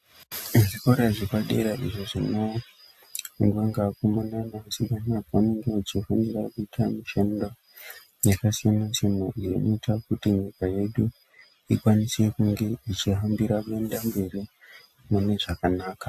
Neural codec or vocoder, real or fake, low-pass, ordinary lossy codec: none; real; 14.4 kHz; AAC, 96 kbps